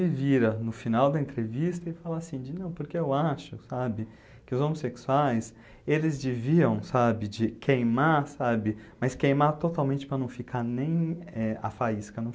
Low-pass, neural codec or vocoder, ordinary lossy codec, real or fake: none; none; none; real